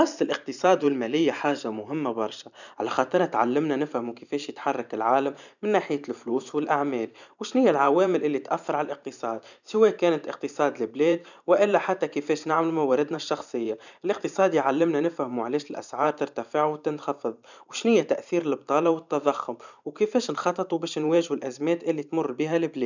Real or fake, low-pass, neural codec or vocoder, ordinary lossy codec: fake; 7.2 kHz; vocoder, 44.1 kHz, 128 mel bands every 512 samples, BigVGAN v2; none